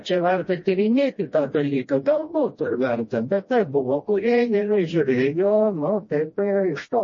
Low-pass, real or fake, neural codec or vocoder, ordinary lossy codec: 7.2 kHz; fake; codec, 16 kHz, 1 kbps, FreqCodec, smaller model; MP3, 32 kbps